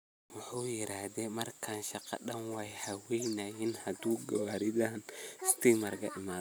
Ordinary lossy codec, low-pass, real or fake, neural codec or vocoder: none; none; real; none